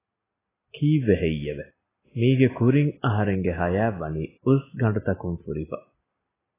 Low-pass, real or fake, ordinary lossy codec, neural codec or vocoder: 3.6 kHz; real; AAC, 16 kbps; none